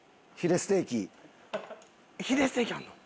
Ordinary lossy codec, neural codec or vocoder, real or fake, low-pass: none; none; real; none